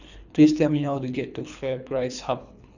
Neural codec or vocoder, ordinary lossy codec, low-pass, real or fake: codec, 24 kHz, 3 kbps, HILCodec; none; 7.2 kHz; fake